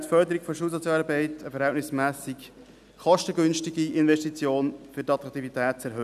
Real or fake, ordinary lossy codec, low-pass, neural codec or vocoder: real; none; 14.4 kHz; none